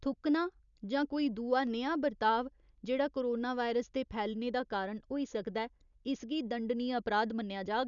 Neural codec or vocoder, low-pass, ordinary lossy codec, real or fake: none; 7.2 kHz; none; real